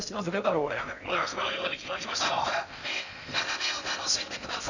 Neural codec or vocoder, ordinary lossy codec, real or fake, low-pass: codec, 16 kHz in and 24 kHz out, 0.6 kbps, FocalCodec, streaming, 2048 codes; none; fake; 7.2 kHz